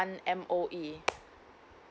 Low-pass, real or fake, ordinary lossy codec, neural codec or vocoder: none; real; none; none